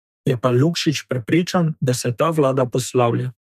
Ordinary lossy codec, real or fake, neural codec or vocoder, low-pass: none; fake; codec, 32 kHz, 1.9 kbps, SNAC; 14.4 kHz